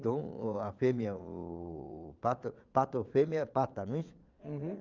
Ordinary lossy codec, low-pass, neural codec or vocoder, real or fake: Opus, 24 kbps; 7.2 kHz; vocoder, 22.05 kHz, 80 mel bands, Vocos; fake